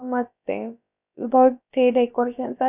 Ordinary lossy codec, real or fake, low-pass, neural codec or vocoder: none; fake; 3.6 kHz; codec, 16 kHz, about 1 kbps, DyCAST, with the encoder's durations